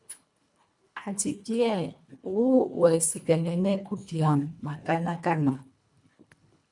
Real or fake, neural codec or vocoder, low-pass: fake; codec, 24 kHz, 1.5 kbps, HILCodec; 10.8 kHz